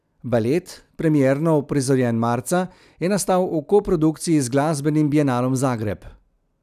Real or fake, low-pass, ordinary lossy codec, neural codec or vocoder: real; 14.4 kHz; none; none